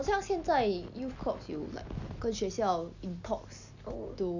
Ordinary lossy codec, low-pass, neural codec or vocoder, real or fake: none; 7.2 kHz; none; real